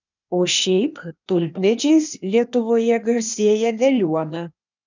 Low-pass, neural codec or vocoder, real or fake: 7.2 kHz; codec, 16 kHz, 0.8 kbps, ZipCodec; fake